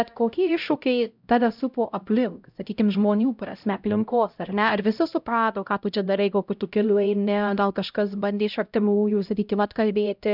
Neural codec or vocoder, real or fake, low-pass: codec, 16 kHz, 0.5 kbps, X-Codec, HuBERT features, trained on LibriSpeech; fake; 5.4 kHz